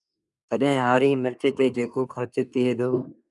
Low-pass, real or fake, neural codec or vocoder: 10.8 kHz; fake; codec, 24 kHz, 1 kbps, SNAC